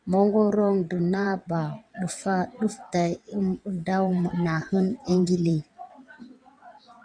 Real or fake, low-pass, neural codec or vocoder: fake; 9.9 kHz; vocoder, 22.05 kHz, 80 mel bands, WaveNeXt